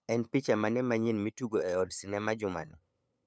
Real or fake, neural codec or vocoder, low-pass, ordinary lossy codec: fake; codec, 16 kHz, 8 kbps, FunCodec, trained on LibriTTS, 25 frames a second; none; none